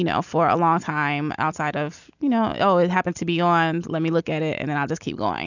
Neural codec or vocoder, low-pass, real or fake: none; 7.2 kHz; real